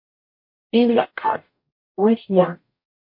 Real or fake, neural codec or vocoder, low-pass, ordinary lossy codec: fake; codec, 44.1 kHz, 0.9 kbps, DAC; 5.4 kHz; MP3, 32 kbps